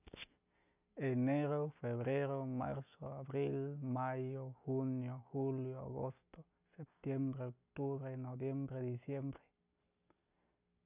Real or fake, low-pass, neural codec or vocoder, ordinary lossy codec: real; 3.6 kHz; none; none